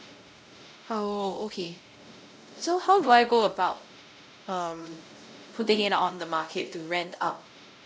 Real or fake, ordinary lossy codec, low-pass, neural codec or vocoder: fake; none; none; codec, 16 kHz, 0.5 kbps, X-Codec, WavLM features, trained on Multilingual LibriSpeech